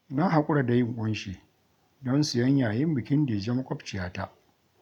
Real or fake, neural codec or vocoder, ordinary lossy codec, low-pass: real; none; none; 19.8 kHz